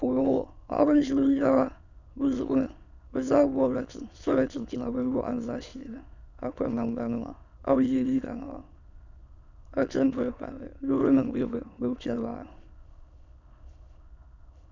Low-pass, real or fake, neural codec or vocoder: 7.2 kHz; fake; autoencoder, 22.05 kHz, a latent of 192 numbers a frame, VITS, trained on many speakers